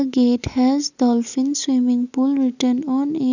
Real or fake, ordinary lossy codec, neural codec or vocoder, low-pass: real; none; none; 7.2 kHz